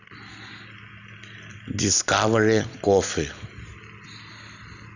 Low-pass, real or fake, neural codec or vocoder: 7.2 kHz; real; none